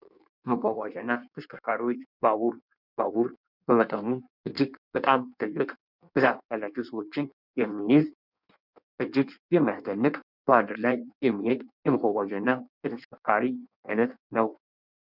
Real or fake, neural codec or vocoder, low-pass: fake; codec, 16 kHz in and 24 kHz out, 1.1 kbps, FireRedTTS-2 codec; 5.4 kHz